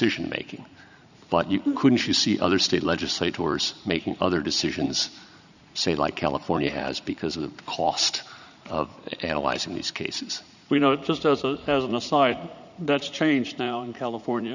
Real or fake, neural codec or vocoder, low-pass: fake; vocoder, 44.1 kHz, 80 mel bands, Vocos; 7.2 kHz